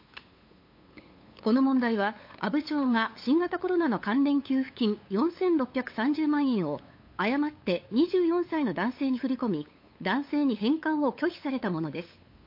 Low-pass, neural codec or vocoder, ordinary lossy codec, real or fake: 5.4 kHz; codec, 16 kHz, 8 kbps, FunCodec, trained on LibriTTS, 25 frames a second; MP3, 32 kbps; fake